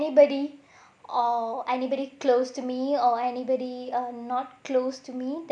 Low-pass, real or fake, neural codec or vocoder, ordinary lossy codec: 9.9 kHz; real; none; none